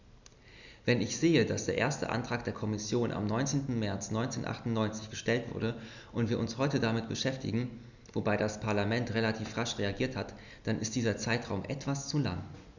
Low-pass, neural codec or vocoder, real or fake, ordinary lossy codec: 7.2 kHz; none; real; none